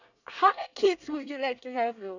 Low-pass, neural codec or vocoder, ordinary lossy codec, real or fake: 7.2 kHz; codec, 24 kHz, 1 kbps, SNAC; none; fake